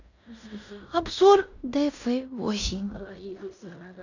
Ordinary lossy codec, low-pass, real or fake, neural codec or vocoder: none; 7.2 kHz; fake; codec, 16 kHz in and 24 kHz out, 0.9 kbps, LongCat-Audio-Codec, fine tuned four codebook decoder